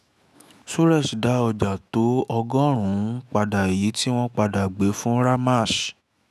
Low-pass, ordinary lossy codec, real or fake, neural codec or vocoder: 14.4 kHz; none; fake; autoencoder, 48 kHz, 128 numbers a frame, DAC-VAE, trained on Japanese speech